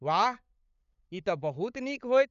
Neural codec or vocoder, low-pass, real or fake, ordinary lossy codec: codec, 16 kHz, 8 kbps, FunCodec, trained on LibriTTS, 25 frames a second; 7.2 kHz; fake; none